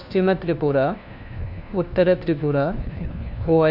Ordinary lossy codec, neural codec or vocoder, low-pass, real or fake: none; codec, 16 kHz, 1 kbps, FunCodec, trained on LibriTTS, 50 frames a second; 5.4 kHz; fake